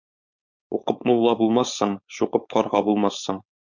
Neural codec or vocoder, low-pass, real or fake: codec, 16 kHz, 4.8 kbps, FACodec; 7.2 kHz; fake